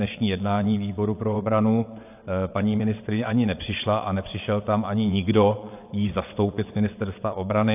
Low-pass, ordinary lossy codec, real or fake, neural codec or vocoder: 3.6 kHz; AAC, 32 kbps; fake; vocoder, 22.05 kHz, 80 mel bands, WaveNeXt